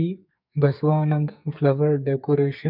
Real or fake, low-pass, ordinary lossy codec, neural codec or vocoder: fake; 5.4 kHz; none; codec, 44.1 kHz, 2.6 kbps, SNAC